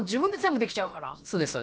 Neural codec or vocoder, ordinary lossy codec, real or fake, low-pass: codec, 16 kHz, about 1 kbps, DyCAST, with the encoder's durations; none; fake; none